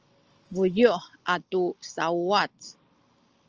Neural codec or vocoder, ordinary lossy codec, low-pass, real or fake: none; Opus, 24 kbps; 7.2 kHz; real